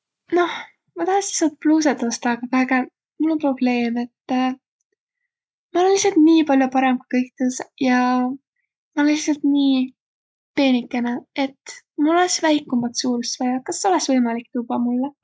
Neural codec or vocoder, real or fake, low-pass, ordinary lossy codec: none; real; none; none